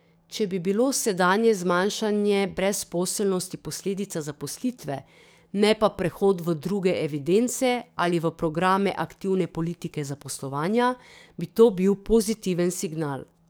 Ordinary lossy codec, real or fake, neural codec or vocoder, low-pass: none; fake; codec, 44.1 kHz, 7.8 kbps, DAC; none